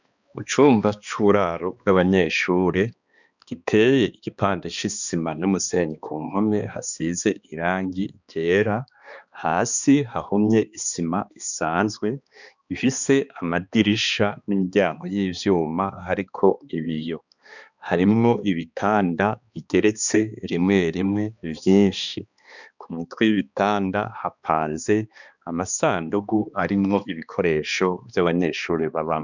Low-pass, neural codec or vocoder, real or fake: 7.2 kHz; codec, 16 kHz, 2 kbps, X-Codec, HuBERT features, trained on balanced general audio; fake